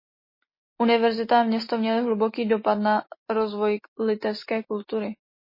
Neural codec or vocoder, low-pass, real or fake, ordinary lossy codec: none; 5.4 kHz; real; MP3, 24 kbps